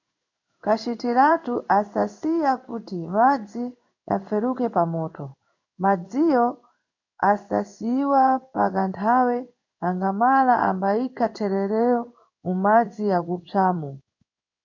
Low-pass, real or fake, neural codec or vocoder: 7.2 kHz; fake; codec, 16 kHz in and 24 kHz out, 1 kbps, XY-Tokenizer